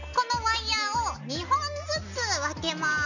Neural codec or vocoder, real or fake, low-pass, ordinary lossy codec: none; real; 7.2 kHz; none